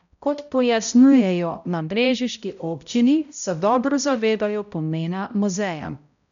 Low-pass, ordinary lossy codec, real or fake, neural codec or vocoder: 7.2 kHz; none; fake; codec, 16 kHz, 0.5 kbps, X-Codec, HuBERT features, trained on balanced general audio